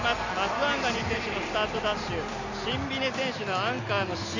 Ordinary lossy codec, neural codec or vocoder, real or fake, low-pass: none; none; real; 7.2 kHz